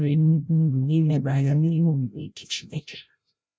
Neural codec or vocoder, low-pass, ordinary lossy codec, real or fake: codec, 16 kHz, 0.5 kbps, FreqCodec, larger model; none; none; fake